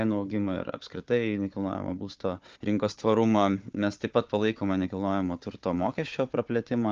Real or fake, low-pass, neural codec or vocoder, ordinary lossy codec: fake; 7.2 kHz; codec, 16 kHz, 4 kbps, FunCodec, trained on Chinese and English, 50 frames a second; Opus, 24 kbps